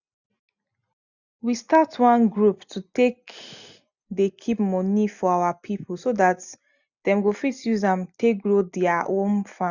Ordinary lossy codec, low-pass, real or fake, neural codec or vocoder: none; 7.2 kHz; real; none